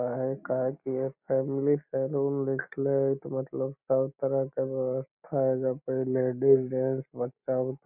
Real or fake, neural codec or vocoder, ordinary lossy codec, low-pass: real; none; none; 3.6 kHz